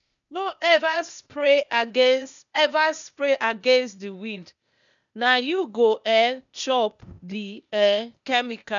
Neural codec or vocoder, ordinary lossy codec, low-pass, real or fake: codec, 16 kHz, 0.8 kbps, ZipCodec; none; 7.2 kHz; fake